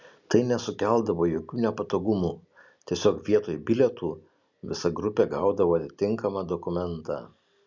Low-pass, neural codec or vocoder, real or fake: 7.2 kHz; none; real